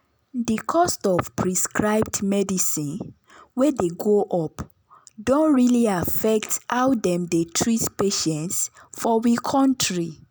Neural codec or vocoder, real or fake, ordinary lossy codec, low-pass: none; real; none; none